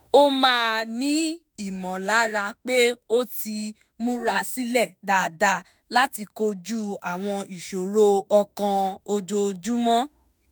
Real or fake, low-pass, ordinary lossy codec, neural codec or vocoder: fake; none; none; autoencoder, 48 kHz, 32 numbers a frame, DAC-VAE, trained on Japanese speech